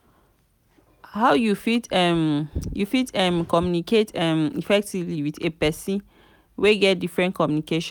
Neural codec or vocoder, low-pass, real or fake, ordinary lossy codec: none; none; real; none